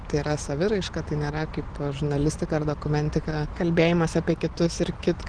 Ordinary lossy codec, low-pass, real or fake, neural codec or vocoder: Opus, 16 kbps; 9.9 kHz; real; none